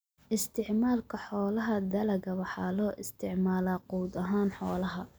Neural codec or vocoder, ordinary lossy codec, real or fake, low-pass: none; none; real; none